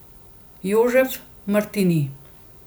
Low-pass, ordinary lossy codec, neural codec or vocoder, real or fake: none; none; none; real